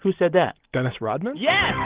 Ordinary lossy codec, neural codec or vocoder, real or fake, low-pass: Opus, 16 kbps; none; real; 3.6 kHz